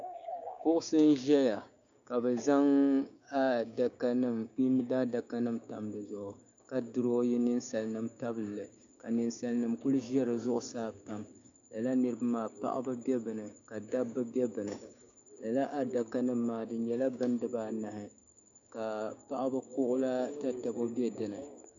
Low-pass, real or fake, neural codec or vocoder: 7.2 kHz; fake; codec, 16 kHz, 6 kbps, DAC